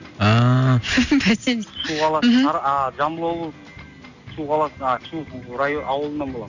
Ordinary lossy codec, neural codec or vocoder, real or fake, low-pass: none; none; real; 7.2 kHz